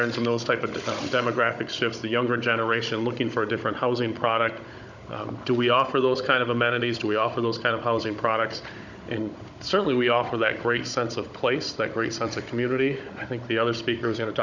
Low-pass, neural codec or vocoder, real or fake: 7.2 kHz; codec, 16 kHz, 16 kbps, FunCodec, trained on Chinese and English, 50 frames a second; fake